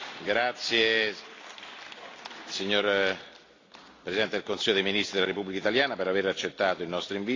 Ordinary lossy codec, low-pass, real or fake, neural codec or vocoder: AAC, 32 kbps; 7.2 kHz; real; none